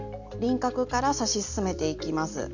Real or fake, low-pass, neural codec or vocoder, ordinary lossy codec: real; 7.2 kHz; none; none